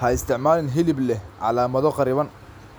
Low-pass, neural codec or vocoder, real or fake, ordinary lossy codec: none; none; real; none